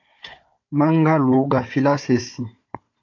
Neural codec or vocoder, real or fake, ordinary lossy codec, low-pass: codec, 16 kHz, 4 kbps, FunCodec, trained on Chinese and English, 50 frames a second; fake; AAC, 48 kbps; 7.2 kHz